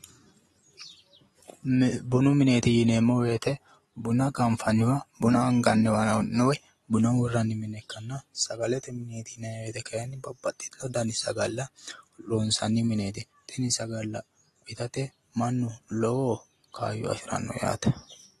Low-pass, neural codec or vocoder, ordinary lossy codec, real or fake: 19.8 kHz; none; AAC, 32 kbps; real